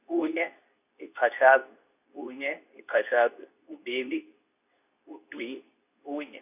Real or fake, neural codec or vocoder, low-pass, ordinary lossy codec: fake; codec, 24 kHz, 0.9 kbps, WavTokenizer, medium speech release version 2; 3.6 kHz; none